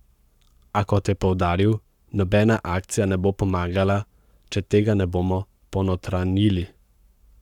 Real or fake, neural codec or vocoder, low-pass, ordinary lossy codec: fake; codec, 44.1 kHz, 7.8 kbps, Pupu-Codec; 19.8 kHz; none